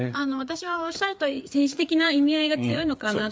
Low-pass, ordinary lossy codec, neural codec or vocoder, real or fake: none; none; codec, 16 kHz, 4 kbps, FreqCodec, larger model; fake